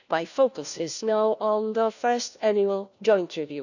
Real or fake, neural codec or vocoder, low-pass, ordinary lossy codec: fake; codec, 16 kHz, 1 kbps, FunCodec, trained on LibriTTS, 50 frames a second; 7.2 kHz; none